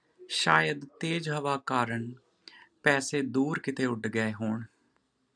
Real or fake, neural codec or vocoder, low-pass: real; none; 9.9 kHz